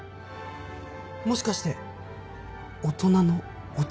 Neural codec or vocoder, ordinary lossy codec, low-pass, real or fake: none; none; none; real